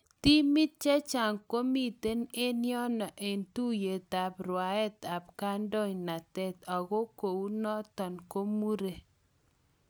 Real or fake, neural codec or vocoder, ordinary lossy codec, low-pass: real; none; none; none